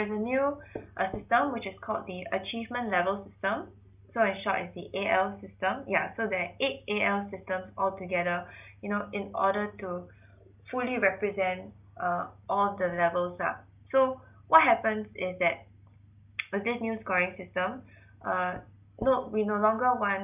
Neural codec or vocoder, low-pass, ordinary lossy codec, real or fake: none; 3.6 kHz; none; real